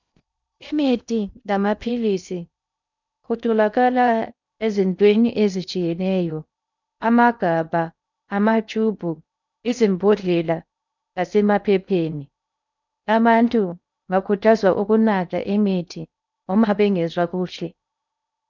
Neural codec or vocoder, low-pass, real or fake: codec, 16 kHz in and 24 kHz out, 0.6 kbps, FocalCodec, streaming, 2048 codes; 7.2 kHz; fake